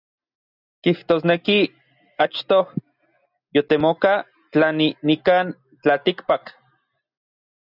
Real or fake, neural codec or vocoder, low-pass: real; none; 5.4 kHz